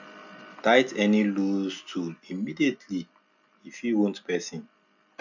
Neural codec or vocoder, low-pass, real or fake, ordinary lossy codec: none; 7.2 kHz; real; none